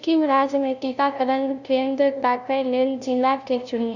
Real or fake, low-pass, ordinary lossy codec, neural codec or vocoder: fake; 7.2 kHz; none; codec, 16 kHz, 0.5 kbps, FunCodec, trained on LibriTTS, 25 frames a second